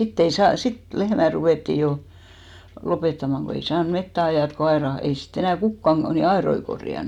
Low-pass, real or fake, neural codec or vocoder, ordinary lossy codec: 19.8 kHz; real; none; none